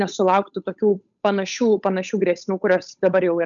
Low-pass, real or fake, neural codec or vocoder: 7.2 kHz; fake; codec, 16 kHz, 8 kbps, FunCodec, trained on Chinese and English, 25 frames a second